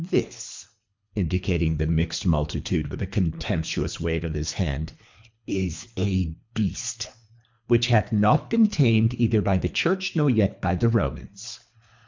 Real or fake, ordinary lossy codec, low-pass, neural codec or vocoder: fake; MP3, 64 kbps; 7.2 kHz; codec, 24 kHz, 3 kbps, HILCodec